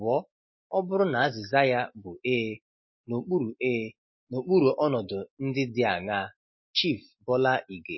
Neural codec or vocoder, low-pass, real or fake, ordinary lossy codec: none; 7.2 kHz; real; MP3, 24 kbps